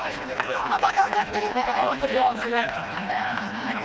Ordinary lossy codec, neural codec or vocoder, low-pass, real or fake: none; codec, 16 kHz, 1 kbps, FreqCodec, smaller model; none; fake